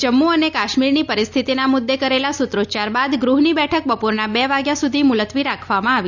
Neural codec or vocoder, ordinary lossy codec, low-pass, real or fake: none; none; 7.2 kHz; real